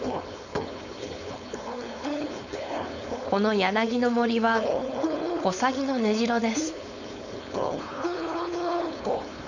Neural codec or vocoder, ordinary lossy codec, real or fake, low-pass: codec, 16 kHz, 4.8 kbps, FACodec; none; fake; 7.2 kHz